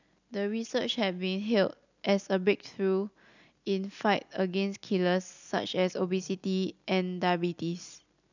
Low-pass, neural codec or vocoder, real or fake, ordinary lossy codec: 7.2 kHz; none; real; none